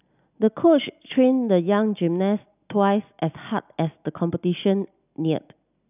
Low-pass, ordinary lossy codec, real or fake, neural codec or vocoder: 3.6 kHz; none; real; none